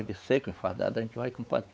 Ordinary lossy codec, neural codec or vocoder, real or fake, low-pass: none; codec, 16 kHz, 4 kbps, X-Codec, WavLM features, trained on Multilingual LibriSpeech; fake; none